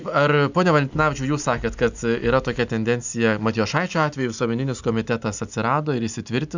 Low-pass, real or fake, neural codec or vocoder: 7.2 kHz; real; none